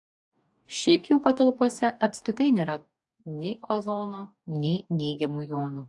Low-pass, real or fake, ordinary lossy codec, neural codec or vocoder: 10.8 kHz; fake; AAC, 64 kbps; codec, 44.1 kHz, 2.6 kbps, DAC